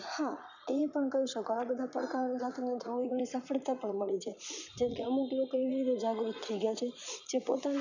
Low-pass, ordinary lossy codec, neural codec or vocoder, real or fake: 7.2 kHz; none; vocoder, 44.1 kHz, 80 mel bands, Vocos; fake